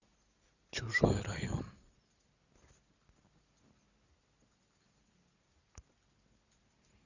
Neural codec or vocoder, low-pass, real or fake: none; 7.2 kHz; real